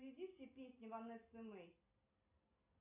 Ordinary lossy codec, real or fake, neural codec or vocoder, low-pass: AAC, 32 kbps; real; none; 3.6 kHz